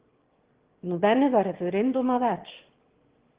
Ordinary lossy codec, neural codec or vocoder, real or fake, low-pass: Opus, 16 kbps; autoencoder, 22.05 kHz, a latent of 192 numbers a frame, VITS, trained on one speaker; fake; 3.6 kHz